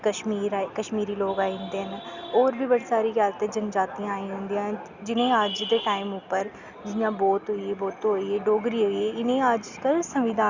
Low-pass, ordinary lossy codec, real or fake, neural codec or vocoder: 7.2 kHz; Opus, 64 kbps; real; none